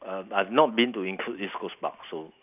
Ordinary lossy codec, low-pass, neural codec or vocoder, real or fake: none; 3.6 kHz; none; real